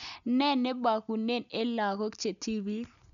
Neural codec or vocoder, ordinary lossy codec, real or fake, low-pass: none; none; real; 7.2 kHz